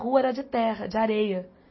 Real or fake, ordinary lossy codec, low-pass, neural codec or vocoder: real; MP3, 24 kbps; 7.2 kHz; none